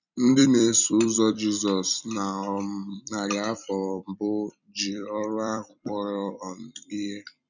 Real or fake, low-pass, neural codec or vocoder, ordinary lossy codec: fake; 7.2 kHz; vocoder, 44.1 kHz, 128 mel bands every 256 samples, BigVGAN v2; none